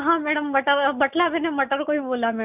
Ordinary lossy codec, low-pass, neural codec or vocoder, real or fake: none; 3.6 kHz; none; real